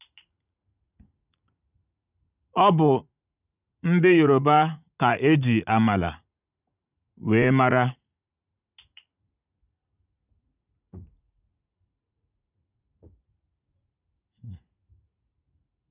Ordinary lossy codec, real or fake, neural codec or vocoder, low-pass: none; fake; vocoder, 44.1 kHz, 80 mel bands, Vocos; 3.6 kHz